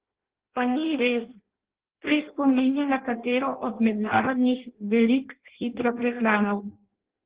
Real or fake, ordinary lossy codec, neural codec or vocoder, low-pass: fake; Opus, 16 kbps; codec, 16 kHz in and 24 kHz out, 0.6 kbps, FireRedTTS-2 codec; 3.6 kHz